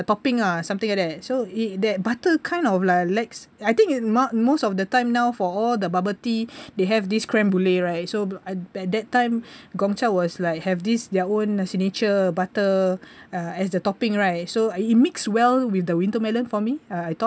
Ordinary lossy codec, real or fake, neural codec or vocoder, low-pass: none; real; none; none